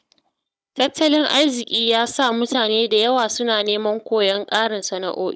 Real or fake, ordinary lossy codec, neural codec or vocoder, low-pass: fake; none; codec, 16 kHz, 16 kbps, FunCodec, trained on Chinese and English, 50 frames a second; none